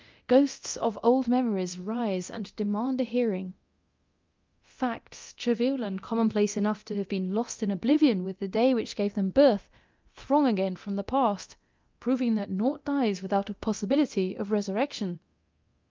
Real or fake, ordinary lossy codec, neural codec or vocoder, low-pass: fake; Opus, 24 kbps; codec, 24 kHz, 0.9 kbps, DualCodec; 7.2 kHz